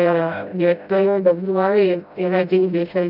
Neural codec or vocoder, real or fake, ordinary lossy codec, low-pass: codec, 16 kHz, 0.5 kbps, FreqCodec, smaller model; fake; none; 5.4 kHz